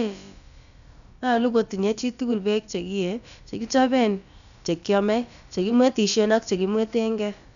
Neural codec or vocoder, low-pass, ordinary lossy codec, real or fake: codec, 16 kHz, about 1 kbps, DyCAST, with the encoder's durations; 7.2 kHz; none; fake